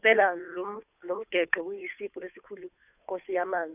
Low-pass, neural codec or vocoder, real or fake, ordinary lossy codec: 3.6 kHz; codec, 16 kHz, 2 kbps, FunCodec, trained on Chinese and English, 25 frames a second; fake; none